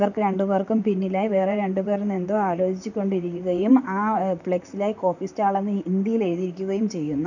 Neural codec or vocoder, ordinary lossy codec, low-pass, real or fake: vocoder, 44.1 kHz, 128 mel bands, Pupu-Vocoder; none; 7.2 kHz; fake